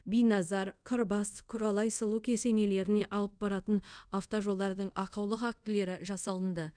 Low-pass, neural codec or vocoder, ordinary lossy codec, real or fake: 9.9 kHz; codec, 24 kHz, 0.5 kbps, DualCodec; none; fake